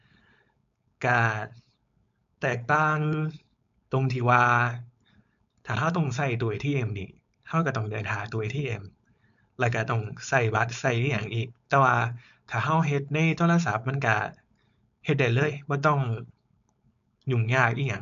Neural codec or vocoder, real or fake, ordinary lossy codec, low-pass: codec, 16 kHz, 4.8 kbps, FACodec; fake; none; 7.2 kHz